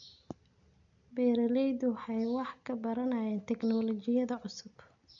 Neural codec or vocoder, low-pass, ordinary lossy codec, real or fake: none; 7.2 kHz; none; real